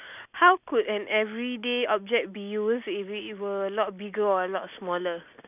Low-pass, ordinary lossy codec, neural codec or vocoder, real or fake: 3.6 kHz; none; none; real